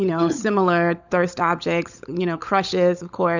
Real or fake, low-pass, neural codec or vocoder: fake; 7.2 kHz; codec, 16 kHz, 16 kbps, FunCodec, trained on LibriTTS, 50 frames a second